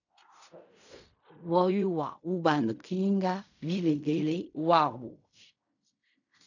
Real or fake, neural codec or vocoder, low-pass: fake; codec, 16 kHz in and 24 kHz out, 0.4 kbps, LongCat-Audio-Codec, fine tuned four codebook decoder; 7.2 kHz